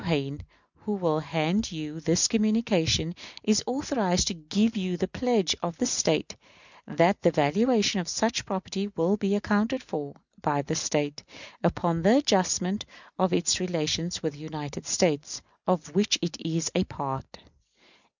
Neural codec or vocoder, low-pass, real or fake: none; 7.2 kHz; real